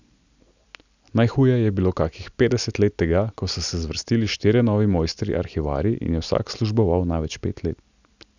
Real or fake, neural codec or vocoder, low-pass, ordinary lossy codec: real; none; 7.2 kHz; none